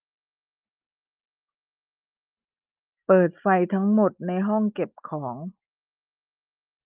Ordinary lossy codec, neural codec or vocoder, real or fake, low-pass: Opus, 32 kbps; none; real; 3.6 kHz